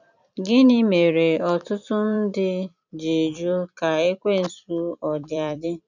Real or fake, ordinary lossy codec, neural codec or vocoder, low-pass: fake; none; vocoder, 44.1 kHz, 128 mel bands every 256 samples, BigVGAN v2; 7.2 kHz